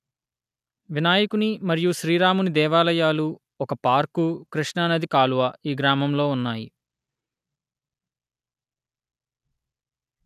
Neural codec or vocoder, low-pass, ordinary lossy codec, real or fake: none; 14.4 kHz; none; real